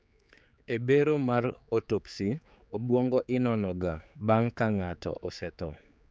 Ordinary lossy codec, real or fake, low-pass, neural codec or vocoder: none; fake; none; codec, 16 kHz, 4 kbps, X-Codec, HuBERT features, trained on general audio